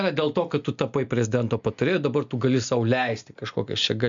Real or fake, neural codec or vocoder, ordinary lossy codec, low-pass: real; none; MP3, 64 kbps; 7.2 kHz